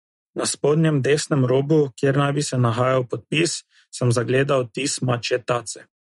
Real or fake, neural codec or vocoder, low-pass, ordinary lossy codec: real; none; 19.8 kHz; MP3, 48 kbps